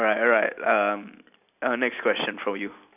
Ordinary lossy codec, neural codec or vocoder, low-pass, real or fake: none; none; 3.6 kHz; real